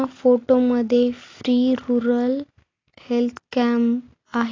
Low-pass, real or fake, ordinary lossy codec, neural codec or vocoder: 7.2 kHz; real; AAC, 32 kbps; none